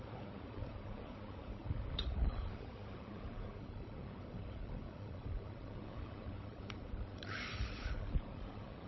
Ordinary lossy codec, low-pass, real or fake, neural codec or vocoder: MP3, 24 kbps; 7.2 kHz; fake; codec, 16 kHz, 16 kbps, FunCodec, trained on LibriTTS, 50 frames a second